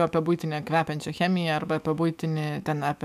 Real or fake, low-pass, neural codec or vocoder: fake; 14.4 kHz; autoencoder, 48 kHz, 128 numbers a frame, DAC-VAE, trained on Japanese speech